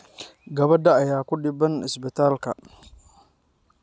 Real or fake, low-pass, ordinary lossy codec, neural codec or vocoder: real; none; none; none